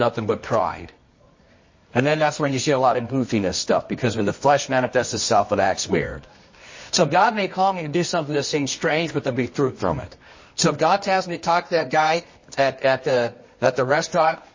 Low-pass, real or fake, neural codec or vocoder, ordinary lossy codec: 7.2 kHz; fake; codec, 24 kHz, 0.9 kbps, WavTokenizer, medium music audio release; MP3, 32 kbps